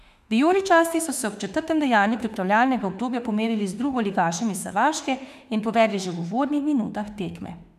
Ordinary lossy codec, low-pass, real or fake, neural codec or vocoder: none; 14.4 kHz; fake; autoencoder, 48 kHz, 32 numbers a frame, DAC-VAE, trained on Japanese speech